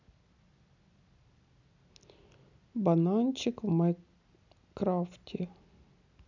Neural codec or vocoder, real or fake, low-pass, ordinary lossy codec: none; real; 7.2 kHz; none